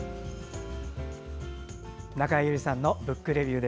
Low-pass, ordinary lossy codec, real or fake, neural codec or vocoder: none; none; real; none